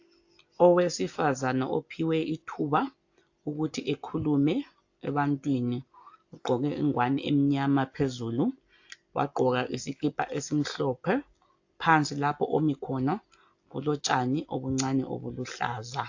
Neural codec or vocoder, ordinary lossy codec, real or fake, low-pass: none; AAC, 48 kbps; real; 7.2 kHz